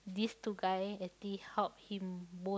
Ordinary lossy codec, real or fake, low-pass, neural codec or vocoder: none; real; none; none